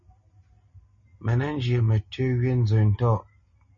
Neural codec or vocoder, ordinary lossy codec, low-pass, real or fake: none; MP3, 32 kbps; 7.2 kHz; real